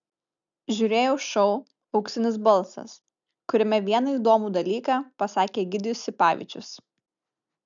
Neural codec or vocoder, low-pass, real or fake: none; 7.2 kHz; real